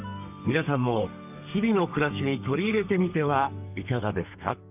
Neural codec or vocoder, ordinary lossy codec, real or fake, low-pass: codec, 32 kHz, 1.9 kbps, SNAC; none; fake; 3.6 kHz